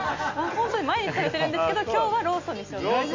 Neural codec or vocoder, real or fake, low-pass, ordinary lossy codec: none; real; 7.2 kHz; MP3, 48 kbps